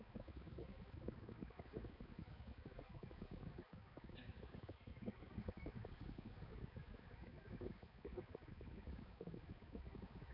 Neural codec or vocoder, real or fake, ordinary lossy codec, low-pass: codec, 16 kHz, 4 kbps, X-Codec, HuBERT features, trained on balanced general audio; fake; none; 5.4 kHz